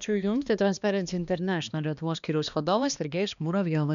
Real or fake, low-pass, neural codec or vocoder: fake; 7.2 kHz; codec, 16 kHz, 2 kbps, X-Codec, HuBERT features, trained on balanced general audio